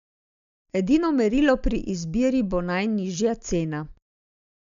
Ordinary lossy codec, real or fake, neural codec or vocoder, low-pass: MP3, 64 kbps; real; none; 7.2 kHz